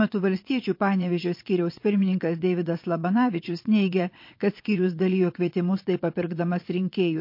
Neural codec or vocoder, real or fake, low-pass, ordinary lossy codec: vocoder, 22.05 kHz, 80 mel bands, WaveNeXt; fake; 5.4 kHz; MP3, 32 kbps